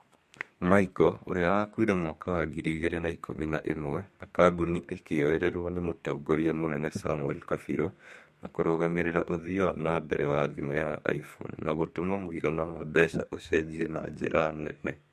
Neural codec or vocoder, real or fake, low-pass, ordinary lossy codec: codec, 32 kHz, 1.9 kbps, SNAC; fake; 14.4 kHz; MP3, 64 kbps